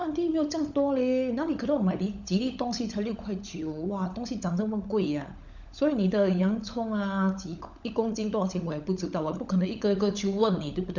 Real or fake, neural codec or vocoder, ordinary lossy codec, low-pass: fake; codec, 16 kHz, 16 kbps, FunCodec, trained on LibriTTS, 50 frames a second; none; 7.2 kHz